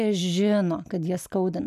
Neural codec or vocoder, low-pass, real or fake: none; 14.4 kHz; real